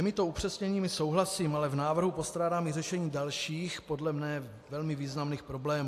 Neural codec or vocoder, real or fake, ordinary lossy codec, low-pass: none; real; AAC, 64 kbps; 14.4 kHz